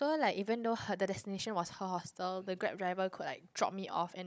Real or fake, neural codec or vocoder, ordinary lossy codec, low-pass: fake; codec, 16 kHz, 16 kbps, FunCodec, trained on Chinese and English, 50 frames a second; none; none